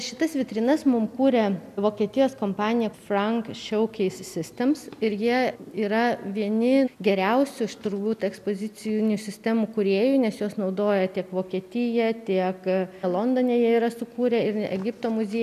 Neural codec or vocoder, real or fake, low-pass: none; real; 14.4 kHz